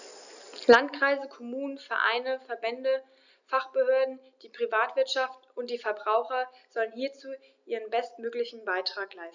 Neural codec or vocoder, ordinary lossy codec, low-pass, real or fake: none; none; 7.2 kHz; real